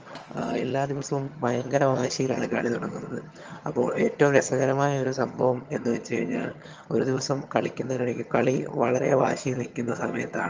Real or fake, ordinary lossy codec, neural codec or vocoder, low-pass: fake; Opus, 24 kbps; vocoder, 22.05 kHz, 80 mel bands, HiFi-GAN; 7.2 kHz